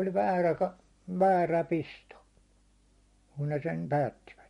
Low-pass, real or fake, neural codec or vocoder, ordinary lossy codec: 10.8 kHz; real; none; MP3, 48 kbps